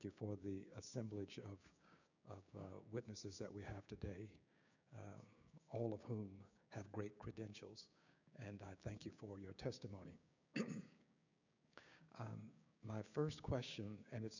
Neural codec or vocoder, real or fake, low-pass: codec, 16 kHz, 6 kbps, DAC; fake; 7.2 kHz